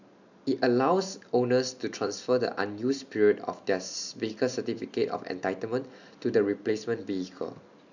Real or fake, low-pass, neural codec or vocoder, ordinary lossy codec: real; 7.2 kHz; none; none